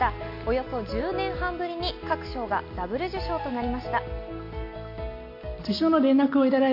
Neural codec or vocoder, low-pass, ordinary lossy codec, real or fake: none; 5.4 kHz; MP3, 32 kbps; real